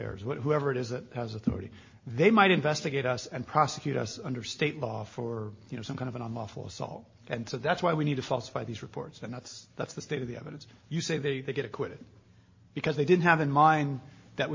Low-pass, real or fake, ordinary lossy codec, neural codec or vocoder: 7.2 kHz; real; MP3, 32 kbps; none